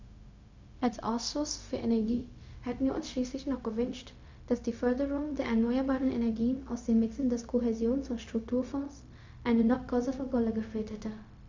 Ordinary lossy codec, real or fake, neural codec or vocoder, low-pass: MP3, 64 kbps; fake; codec, 16 kHz, 0.4 kbps, LongCat-Audio-Codec; 7.2 kHz